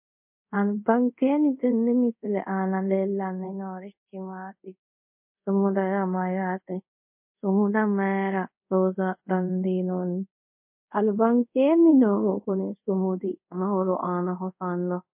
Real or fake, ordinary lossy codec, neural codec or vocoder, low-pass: fake; MP3, 32 kbps; codec, 24 kHz, 0.5 kbps, DualCodec; 3.6 kHz